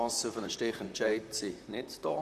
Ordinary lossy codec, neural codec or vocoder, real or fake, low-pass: none; vocoder, 44.1 kHz, 128 mel bands, Pupu-Vocoder; fake; 14.4 kHz